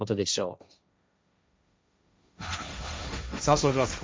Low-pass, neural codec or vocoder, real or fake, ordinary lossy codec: none; codec, 16 kHz, 1.1 kbps, Voila-Tokenizer; fake; none